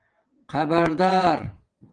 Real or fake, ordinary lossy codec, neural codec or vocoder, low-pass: fake; Opus, 24 kbps; vocoder, 22.05 kHz, 80 mel bands, WaveNeXt; 9.9 kHz